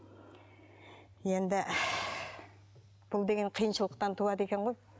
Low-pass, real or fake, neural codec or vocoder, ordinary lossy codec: none; real; none; none